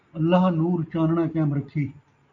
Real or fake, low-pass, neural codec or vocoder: real; 7.2 kHz; none